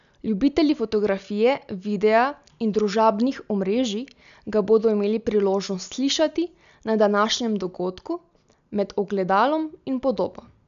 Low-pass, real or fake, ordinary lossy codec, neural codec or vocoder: 7.2 kHz; real; none; none